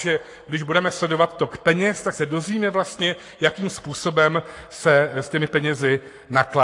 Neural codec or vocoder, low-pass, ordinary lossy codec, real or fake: codec, 44.1 kHz, 7.8 kbps, Pupu-Codec; 10.8 kHz; AAC, 48 kbps; fake